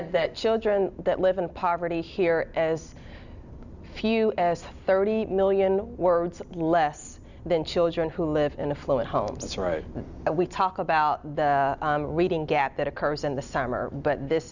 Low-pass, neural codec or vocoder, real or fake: 7.2 kHz; none; real